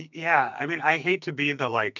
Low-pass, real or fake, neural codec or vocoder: 7.2 kHz; fake; codec, 44.1 kHz, 2.6 kbps, SNAC